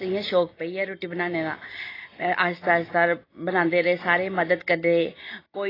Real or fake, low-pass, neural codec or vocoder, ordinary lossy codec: real; 5.4 kHz; none; AAC, 24 kbps